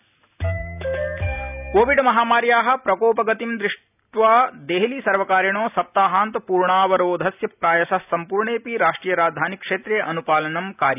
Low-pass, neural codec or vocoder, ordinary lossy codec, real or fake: 3.6 kHz; none; none; real